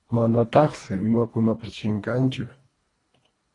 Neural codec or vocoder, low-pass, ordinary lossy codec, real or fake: codec, 24 kHz, 1.5 kbps, HILCodec; 10.8 kHz; AAC, 32 kbps; fake